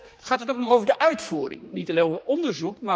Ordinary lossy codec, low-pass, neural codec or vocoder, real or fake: none; none; codec, 16 kHz, 2 kbps, X-Codec, HuBERT features, trained on general audio; fake